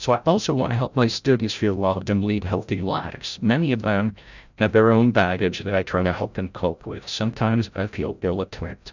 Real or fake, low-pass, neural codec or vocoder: fake; 7.2 kHz; codec, 16 kHz, 0.5 kbps, FreqCodec, larger model